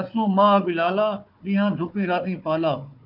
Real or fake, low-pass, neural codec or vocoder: fake; 5.4 kHz; codec, 16 kHz, 4 kbps, FunCodec, trained on Chinese and English, 50 frames a second